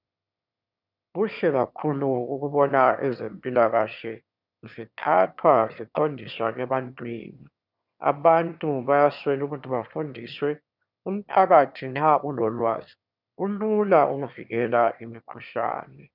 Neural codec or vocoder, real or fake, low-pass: autoencoder, 22.05 kHz, a latent of 192 numbers a frame, VITS, trained on one speaker; fake; 5.4 kHz